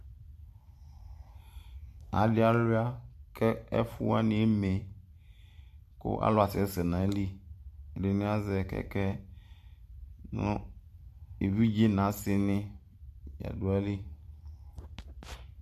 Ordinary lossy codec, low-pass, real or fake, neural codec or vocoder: MP3, 96 kbps; 14.4 kHz; fake; vocoder, 44.1 kHz, 128 mel bands every 512 samples, BigVGAN v2